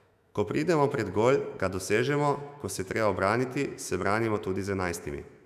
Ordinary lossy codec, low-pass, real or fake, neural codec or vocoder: none; 14.4 kHz; fake; autoencoder, 48 kHz, 128 numbers a frame, DAC-VAE, trained on Japanese speech